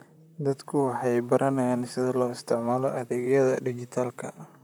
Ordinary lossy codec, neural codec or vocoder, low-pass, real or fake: none; vocoder, 44.1 kHz, 128 mel bands, Pupu-Vocoder; none; fake